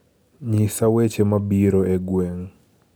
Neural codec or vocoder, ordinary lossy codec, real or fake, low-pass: none; none; real; none